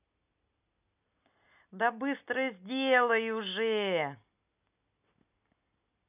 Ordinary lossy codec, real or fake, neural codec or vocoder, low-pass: none; real; none; 3.6 kHz